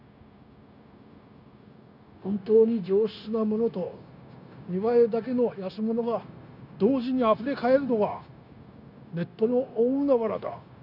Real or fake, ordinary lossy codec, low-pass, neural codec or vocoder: fake; none; 5.4 kHz; codec, 16 kHz, 0.9 kbps, LongCat-Audio-Codec